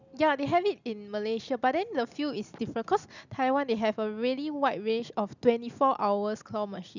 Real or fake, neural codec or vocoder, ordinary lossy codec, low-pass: real; none; none; 7.2 kHz